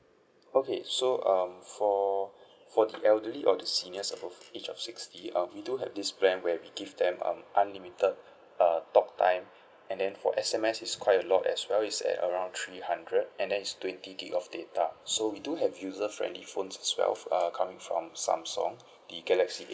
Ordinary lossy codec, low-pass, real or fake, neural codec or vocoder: none; none; real; none